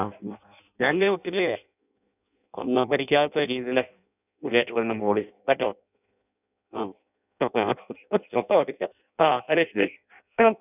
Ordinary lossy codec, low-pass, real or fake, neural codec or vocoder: none; 3.6 kHz; fake; codec, 16 kHz in and 24 kHz out, 0.6 kbps, FireRedTTS-2 codec